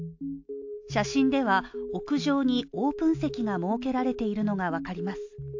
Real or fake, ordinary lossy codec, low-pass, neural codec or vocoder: real; AAC, 48 kbps; 7.2 kHz; none